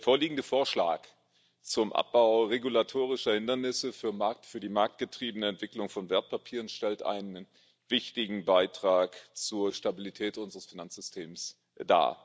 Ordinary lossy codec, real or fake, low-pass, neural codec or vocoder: none; real; none; none